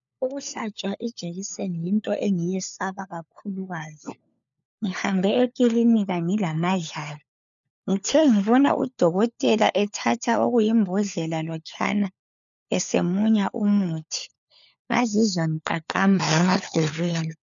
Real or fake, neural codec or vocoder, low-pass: fake; codec, 16 kHz, 4 kbps, FunCodec, trained on LibriTTS, 50 frames a second; 7.2 kHz